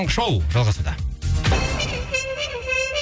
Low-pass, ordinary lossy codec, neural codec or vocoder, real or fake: none; none; none; real